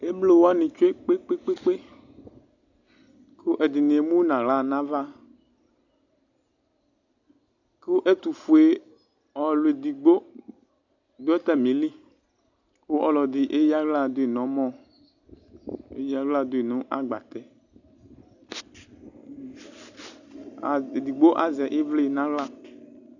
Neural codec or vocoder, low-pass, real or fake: none; 7.2 kHz; real